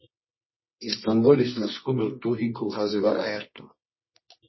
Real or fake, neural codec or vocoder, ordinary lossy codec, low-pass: fake; codec, 24 kHz, 0.9 kbps, WavTokenizer, medium music audio release; MP3, 24 kbps; 7.2 kHz